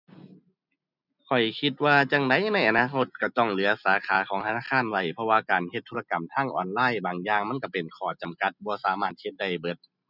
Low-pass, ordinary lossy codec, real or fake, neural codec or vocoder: 5.4 kHz; none; real; none